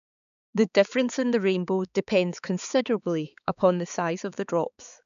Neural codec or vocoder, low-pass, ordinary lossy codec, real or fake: codec, 16 kHz, 4 kbps, X-Codec, HuBERT features, trained on balanced general audio; 7.2 kHz; none; fake